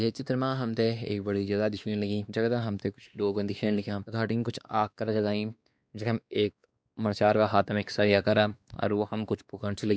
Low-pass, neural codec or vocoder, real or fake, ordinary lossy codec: none; codec, 16 kHz, 2 kbps, X-Codec, WavLM features, trained on Multilingual LibriSpeech; fake; none